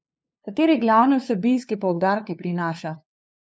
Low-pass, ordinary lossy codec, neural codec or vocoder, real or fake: none; none; codec, 16 kHz, 2 kbps, FunCodec, trained on LibriTTS, 25 frames a second; fake